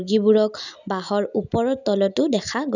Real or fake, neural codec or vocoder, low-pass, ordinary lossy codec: real; none; 7.2 kHz; none